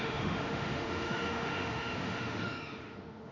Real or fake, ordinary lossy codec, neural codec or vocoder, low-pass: fake; none; autoencoder, 48 kHz, 32 numbers a frame, DAC-VAE, trained on Japanese speech; 7.2 kHz